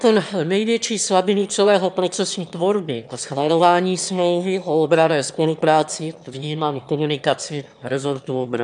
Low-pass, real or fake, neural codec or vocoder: 9.9 kHz; fake; autoencoder, 22.05 kHz, a latent of 192 numbers a frame, VITS, trained on one speaker